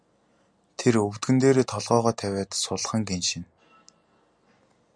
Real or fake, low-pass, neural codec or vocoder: real; 9.9 kHz; none